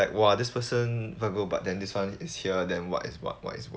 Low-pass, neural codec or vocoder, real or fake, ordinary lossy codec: none; none; real; none